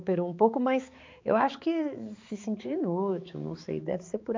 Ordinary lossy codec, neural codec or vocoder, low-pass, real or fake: MP3, 64 kbps; codec, 16 kHz, 4 kbps, X-Codec, HuBERT features, trained on balanced general audio; 7.2 kHz; fake